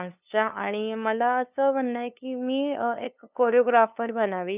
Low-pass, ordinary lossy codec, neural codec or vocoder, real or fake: 3.6 kHz; none; codec, 16 kHz, 2 kbps, FunCodec, trained on LibriTTS, 25 frames a second; fake